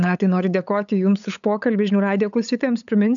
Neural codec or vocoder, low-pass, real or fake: codec, 16 kHz, 8 kbps, FunCodec, trained on LibriTTS, 25 frames a second; 7.2 kHz; fake